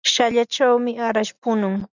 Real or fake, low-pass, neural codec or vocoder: real; 7.2 kHz; none